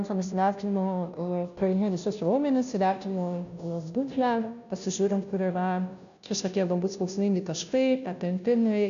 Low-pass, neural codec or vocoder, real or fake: 7.2 kHz; codec, 16 kHz, 0.5 kbps, FunCodec, trained on Chinese and English, 25 frames a second; fake